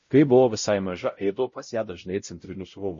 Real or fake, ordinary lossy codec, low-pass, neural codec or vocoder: fake; MP3, 32 kbps; 7.2 kHz; codec, 16 kHz, 0.5 kbps, X-Codec, WavLM features, trained on Multilingual LibriSpeech